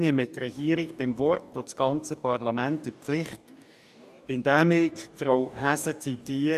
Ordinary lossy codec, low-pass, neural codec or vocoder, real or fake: none; 14.4 kHz; codec, 44.1 kHz, 2.6 kbps, DAC; fake